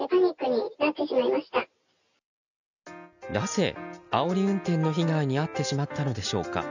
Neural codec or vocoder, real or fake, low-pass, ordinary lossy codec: none; real; 7.2 kHz; none